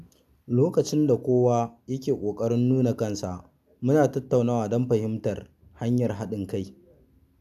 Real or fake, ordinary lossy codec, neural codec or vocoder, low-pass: real; none; none; 14.4 kHz